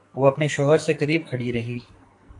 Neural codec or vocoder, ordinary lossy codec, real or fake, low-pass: codec, 44.1 kHz, 2.6 kbps, SNAC; AAC, 64 kbps; fake; 10.8 kHz